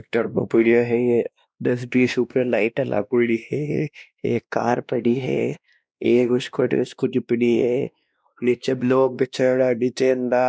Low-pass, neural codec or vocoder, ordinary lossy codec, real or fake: none; codec, 16 kHz, 1 kbps, X-Codec, WavLM features, trained on Multilingual LibriSpeech; none; fake